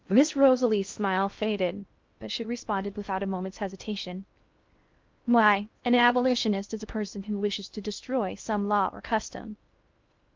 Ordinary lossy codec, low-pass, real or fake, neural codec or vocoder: Opus, 24 kbps; 7.2 kHz; fake; codec, 16 kHz in and 24 kHz out, 0.6 kbps, FocalCodec, streaming, 4096 codes